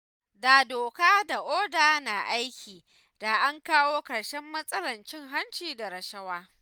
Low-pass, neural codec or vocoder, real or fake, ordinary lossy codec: none; none; real; none